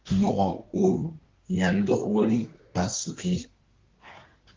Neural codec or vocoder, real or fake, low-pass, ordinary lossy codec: codec, 24 kHz, 1 kbps, SNAC; fake; 7.2 kHz; Opus, 32 kbps